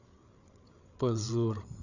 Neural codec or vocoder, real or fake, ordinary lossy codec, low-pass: codec, 16 kHz, 8 kbps, FreqCodec, larger model; fake; none; 7.2 kHz